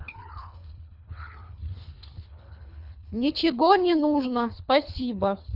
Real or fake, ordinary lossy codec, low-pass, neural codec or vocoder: fake; none; 5.4 kHz; codec, 24 kHz, 3 kbps, HILCodec